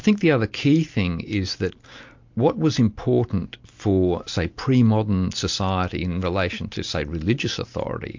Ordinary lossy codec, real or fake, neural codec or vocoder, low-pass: MP3, 48 kbps; real; none; 7.2 kHz